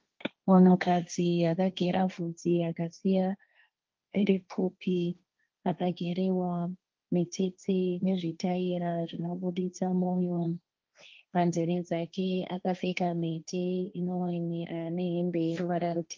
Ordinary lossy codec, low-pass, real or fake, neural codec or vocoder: Opus, 24 kbps; 7.2 kHz; fake; codec, 16 kHz, 1.1 kbps, Voila-Tokenizer